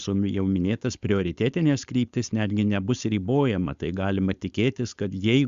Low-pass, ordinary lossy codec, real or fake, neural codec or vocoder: 7.2 kHz; Opus, 64 kbps; fake; codec, 16 kHz, 4.8 kbps, FACodec